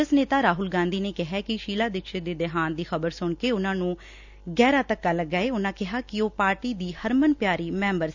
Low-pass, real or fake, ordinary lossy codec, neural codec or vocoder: 7.2 kHz; real; none; none